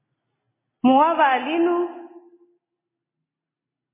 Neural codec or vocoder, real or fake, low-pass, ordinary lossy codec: none; real; 3.6 kHz; MP3, 16 kbps